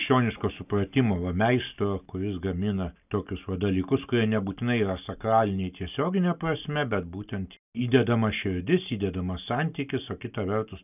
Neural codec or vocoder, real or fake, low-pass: none; real; 3.6 kHz